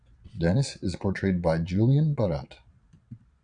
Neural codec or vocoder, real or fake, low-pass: vocoder, 22.05 kHz, 80 mel bands, Vocos; fake; 9.9 kHz